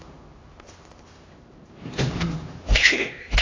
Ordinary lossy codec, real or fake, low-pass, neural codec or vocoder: AAC, 32 kbps; fake; 7.2 kHz; codec, 16 kHz, 1 kbps, X-Codec, HuBERT features, trained on LibriSpeech